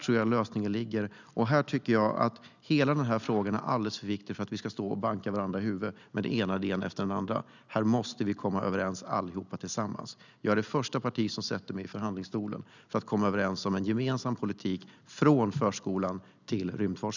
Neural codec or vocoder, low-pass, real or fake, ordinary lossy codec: none; 7.2 kHz; real; none